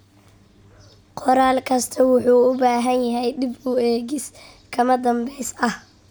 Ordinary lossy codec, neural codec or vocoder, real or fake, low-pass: none; none; real; none